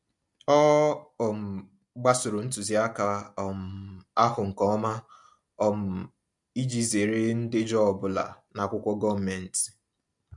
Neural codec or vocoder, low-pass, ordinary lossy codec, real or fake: none; 10.8 kHz; MP3, 64 kbps; real